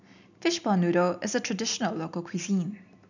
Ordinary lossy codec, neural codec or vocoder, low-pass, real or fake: none; none; 7.2 kHz; real